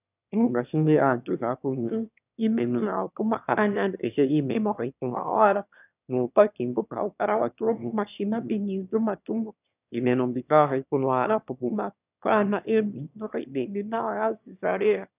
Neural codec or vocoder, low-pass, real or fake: autoencoder, 22.05 kHz, a latent of 192 numbers a frame, VITS, trained on one speaker; 3.6 kHz; fake